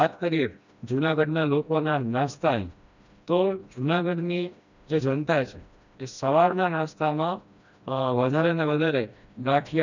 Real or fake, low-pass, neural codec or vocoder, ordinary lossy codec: fake; 7.2 kHz; codec, 16 kHz, 1 kbps, FreqCodec, smaller model; none